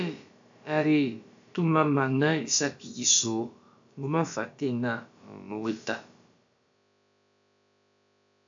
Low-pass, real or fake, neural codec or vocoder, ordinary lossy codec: 7.2 kHz; fake; codec, 16 kHz, about 1 kbps, DyCAST, with the encoder's durations; AAC, 64 kbps